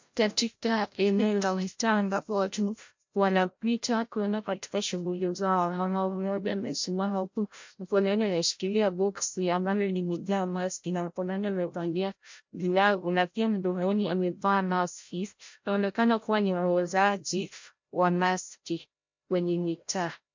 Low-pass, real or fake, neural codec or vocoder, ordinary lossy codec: 7.2 kHz; fake; codec, 16 kHz, 0.5 kbps, FreqCodec, larger model; MP3, 48 kbps